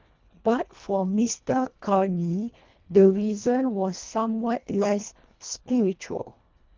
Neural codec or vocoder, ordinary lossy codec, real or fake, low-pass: codec, 24 kHz, 1.5 kbps, HILCodec; Opus, 24 kbps; fake; 7.2 kHz